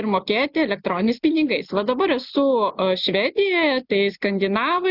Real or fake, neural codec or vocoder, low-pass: real; none; 5.4 kHz